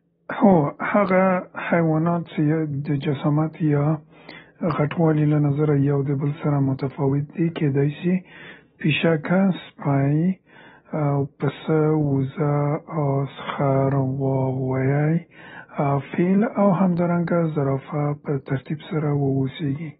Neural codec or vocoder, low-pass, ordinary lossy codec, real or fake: none; 7.2 kHz; AAC, 16 kbps; real